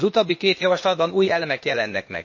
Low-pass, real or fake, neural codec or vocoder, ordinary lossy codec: 7.2 kHz; fake; codec, 16 kHz, 0.8 kbps, ZipCodec; MP3, 32 kbps